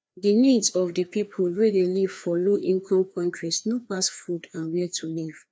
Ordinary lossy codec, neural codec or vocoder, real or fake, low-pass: none; codec, 16 kHz, 2 kbps, FreqCodec, larger model; fake; none